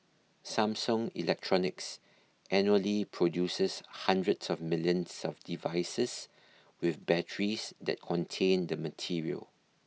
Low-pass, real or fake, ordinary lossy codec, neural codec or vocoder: none; real; none; none